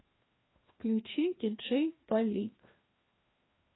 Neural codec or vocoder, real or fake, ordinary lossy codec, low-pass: codec, 16 kHz, 2 kbps, FreqCodec, larger model; fake; AAC, 16 kbps; 7.2 kHz